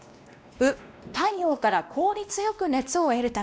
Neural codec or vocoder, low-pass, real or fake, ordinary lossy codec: codec, 16 kHz, 1 kbps, X-Codec, WavLM features, trained on Multilingual LibriSpeech; none; fake; none